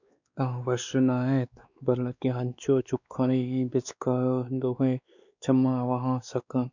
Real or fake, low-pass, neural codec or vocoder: fake; 7.2 kHz; codec, 16 kHz, 2 kbps, X-Codec, WavLM features, trained on Multilingual LibriSpeech